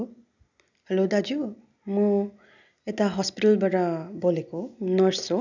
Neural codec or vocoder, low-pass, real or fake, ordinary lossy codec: none; 7.2 kHz; real; none